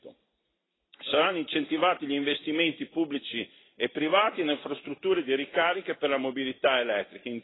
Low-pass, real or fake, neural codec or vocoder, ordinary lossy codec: 7.2 kHz; real; none; AAC, 16 kbps